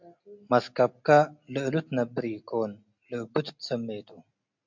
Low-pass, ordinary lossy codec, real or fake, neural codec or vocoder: 7.2 kHz; MP3, 64 kbps; real; none